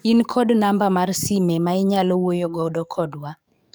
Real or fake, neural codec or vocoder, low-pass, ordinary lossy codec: fake; codec, 44.1 kHz, 7.8 kbps, DAC; none; none